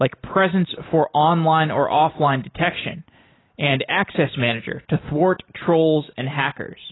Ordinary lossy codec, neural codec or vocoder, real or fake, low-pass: AAC, 16 kbps; none; real; 7.2 kHz